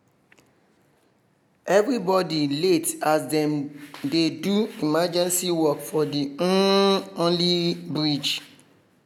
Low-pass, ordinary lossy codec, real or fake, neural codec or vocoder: 19.8 kHz; none; real; none